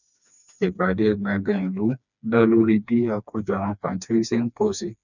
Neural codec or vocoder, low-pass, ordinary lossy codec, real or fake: codec, 16 kHz, 2 kbps, FreqCodec, smaller model; 7.2 kHz; none; fake